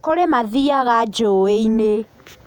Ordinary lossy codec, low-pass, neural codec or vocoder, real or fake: none; 19.8 kHz; vocoder, 48 kHz, 128 mel bands, Vocos; fake